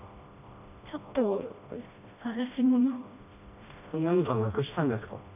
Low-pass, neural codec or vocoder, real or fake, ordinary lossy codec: 3.6 kHz; codec, 16 kHz, 1 kbps, FreqCodec, smaller model; fake; none